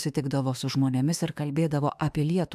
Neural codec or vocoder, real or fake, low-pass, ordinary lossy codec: autoencoder, 48 kHz, 32 numbers a frame, DAC-VAE, trained on Japanese speech; fake; 14.4 kHz; AAC, 96 kbps